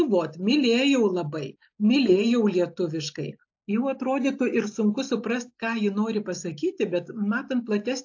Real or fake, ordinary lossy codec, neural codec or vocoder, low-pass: real; AAC, 48 kbps; none; 7.2 kHz